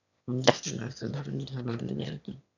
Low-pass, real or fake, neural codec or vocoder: 7.2 kHz; fake; autoencoder, 22.05 kHz, a latent of 192 numbers a frame, VITS, trained on one speaker